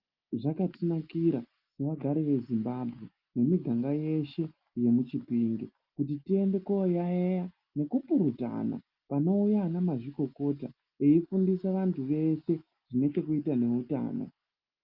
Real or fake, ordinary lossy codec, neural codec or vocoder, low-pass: real; Opus, 24 kbps; none; 5.4 kHz